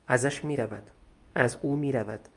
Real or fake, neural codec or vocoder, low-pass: fake; codec, 24 kHz, 0.9 kbps, WavTokenizer, medium speech release version 2; 10.8 kHz